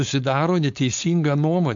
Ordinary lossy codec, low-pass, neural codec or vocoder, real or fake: MP3, 64 kbps; 7.2 kHz; codec, 16 kHz, 4.8 kbps, FACodec; fake